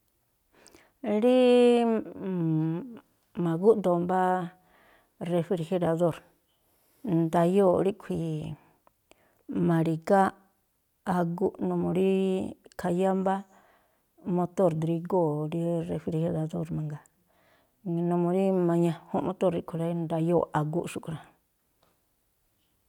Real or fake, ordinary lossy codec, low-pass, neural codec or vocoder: real; none; 19.8 kHz; none